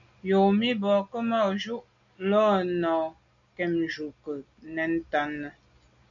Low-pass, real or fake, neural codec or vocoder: 7.2 kHz; real; none